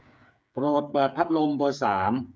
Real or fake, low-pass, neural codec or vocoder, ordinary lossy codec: fake; none; codec, 16 kHz, 8 kbps, FreqCodec, smaller model; none